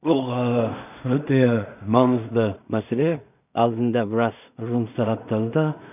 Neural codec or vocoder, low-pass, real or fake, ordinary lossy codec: codec, 16 kHz in and 24 kHz out, 0.4 kbps, LongCat-Audio-Codec, two codebook decoder; 3.6 kHz; fake; none